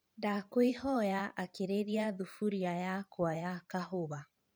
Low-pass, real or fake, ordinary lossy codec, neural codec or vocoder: none; fake; none; vocoder, 44.1 kHz, 128 mel bands every 512 samples, BigVGAN v2